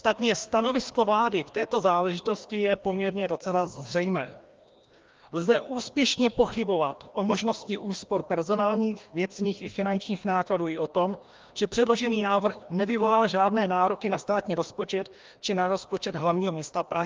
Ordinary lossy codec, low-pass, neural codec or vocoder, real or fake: Opus, 32 kbps; 7.2 kHz; codec, 16 kHz, 1 kbps, FreqCodec, larger model; fake